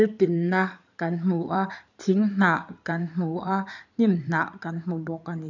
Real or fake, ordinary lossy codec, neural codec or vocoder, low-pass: fake; none; codec, 16 kHz in and 24 kHz out, 2.2 kbps, FireRedTTS-2 codec; 7.2 kHz